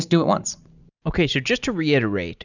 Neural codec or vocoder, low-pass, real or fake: none; 7.2 kHz; real